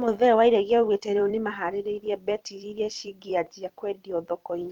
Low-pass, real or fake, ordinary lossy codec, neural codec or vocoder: 19.8 kHz; fake; Opus, 32 kbps; vocoder, 44.1 kHz, 128 mel bands every 256 samples, BigVGAN v2